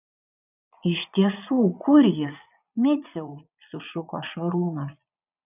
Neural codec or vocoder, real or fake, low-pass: vocoder, 22.05 kHz, 80 mel bands, Vocos; fake; 3.6 kHz